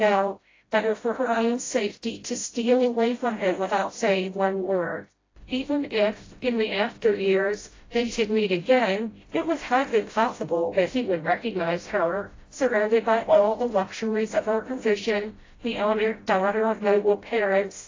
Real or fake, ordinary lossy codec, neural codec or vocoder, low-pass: fake; AAC, 32 kbps; codec, 16 kHz, 0.5 kbps, FreqCodec, smaller model; 7.2 kHz